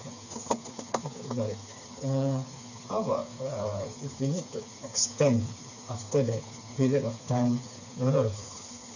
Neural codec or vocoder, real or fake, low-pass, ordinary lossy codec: codec, 16 kHz, 4 kbps, FreqCodec, smaller model; fake; 7.2 kHz; none